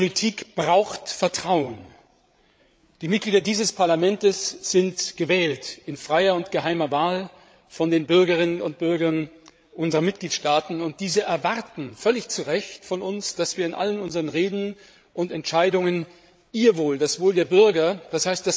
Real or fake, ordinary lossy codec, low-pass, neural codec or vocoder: fake; none; none; codec, 16 kHz, 8 kbps, FreqCodec, larger model